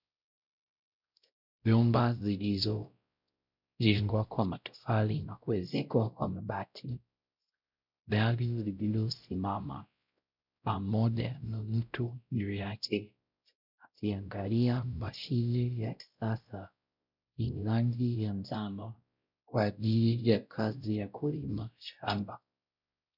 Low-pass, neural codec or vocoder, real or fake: 5.4 kHz; codec, 16 kHz, 0.5 kbps, X-Codec, WavLM features, trained on Multilingual LibriSpeech; fake